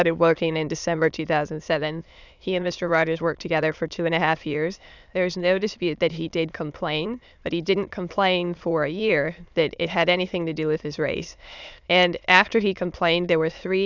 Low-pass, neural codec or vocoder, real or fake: 7.2 kHz; autoencoder, 22.05 kHz, a latent of 192 numbers a frame, VITS, trained on many speakers; fake